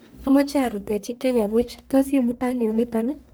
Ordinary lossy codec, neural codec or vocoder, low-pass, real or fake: none; codec, 44.1 kHz, 1.7 kbps, Pupu-Codec; none; fake